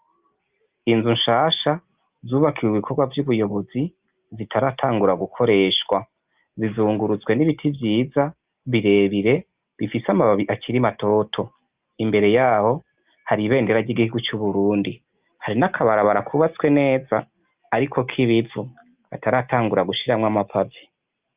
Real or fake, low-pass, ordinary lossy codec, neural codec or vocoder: real; 3.6 kHz; Opus, 24 kbps; none